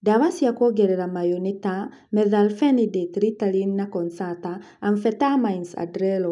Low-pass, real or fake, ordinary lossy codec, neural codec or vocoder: 10.8 kHz; real; none; none